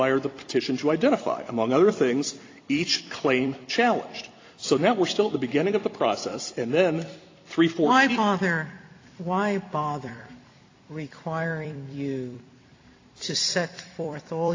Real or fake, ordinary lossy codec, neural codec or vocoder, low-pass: fake; AAC, 32 kbps; vocoder, 44.1 kHz, 128 mel bands every 256 samples, BigVGAN v2; 7.2 kHz